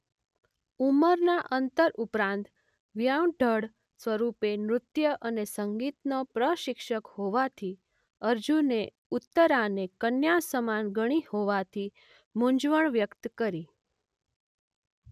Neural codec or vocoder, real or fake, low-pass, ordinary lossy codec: none; real; 14.4 kHz; none